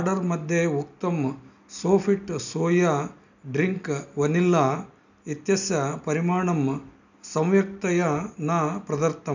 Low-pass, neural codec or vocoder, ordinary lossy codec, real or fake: 7.2 kHz; none; none; real